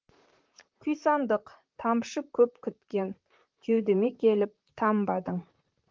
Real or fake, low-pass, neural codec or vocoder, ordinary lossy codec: fake; 7.2 kHz; vocoder, 44.1 kHz, 128 mel bands, Pupu-Vocoder; Opus, 32 kbps